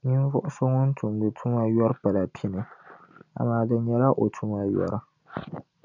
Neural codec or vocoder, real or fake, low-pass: none; real; 7.2 kHz